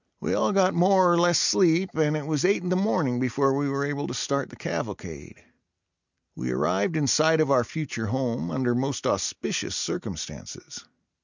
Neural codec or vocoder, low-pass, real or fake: none; 7.2 kHz; real